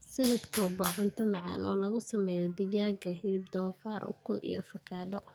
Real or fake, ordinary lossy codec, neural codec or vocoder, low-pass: fake; none; codec, 44.1 kHz, 2.6 kbps, SNAC; none